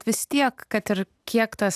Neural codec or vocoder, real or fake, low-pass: none; real; 14.4 kHz